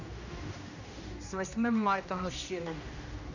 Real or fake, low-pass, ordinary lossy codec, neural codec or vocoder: fake; 7.2 kHz; none; codec, 16 kHz, 1 kbps, X-Codec, HuBERT features, trained on general audio